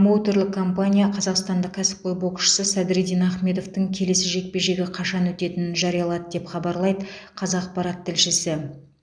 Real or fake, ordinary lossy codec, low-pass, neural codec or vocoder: real; none; 9.9 kHz; none